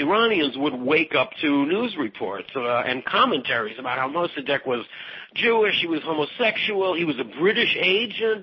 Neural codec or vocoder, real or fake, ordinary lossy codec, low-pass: none; real; MP3, 24 kbps; 7.2 kHz